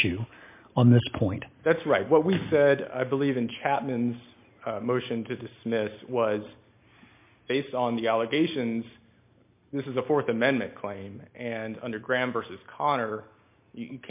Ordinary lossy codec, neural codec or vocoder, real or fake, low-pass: MP3, 24 kbps; none; real; 3.6 kHz